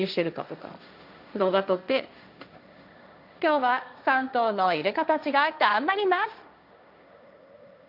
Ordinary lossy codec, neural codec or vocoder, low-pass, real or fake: none; codec, 16 kHz, 1.1 kbps, Voila-Tokenizer; 5.4 kHz; fake